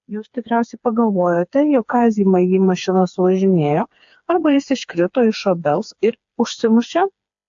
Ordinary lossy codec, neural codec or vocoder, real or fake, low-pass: AAC, 64 kbps; codec, 16 kHz, 4 kbps, FreqCodec, smaller model; fake; 7.2 kHz